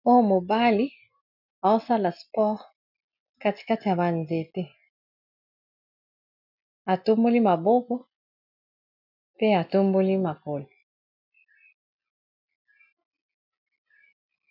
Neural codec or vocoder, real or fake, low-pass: none; real; 5.4 kHz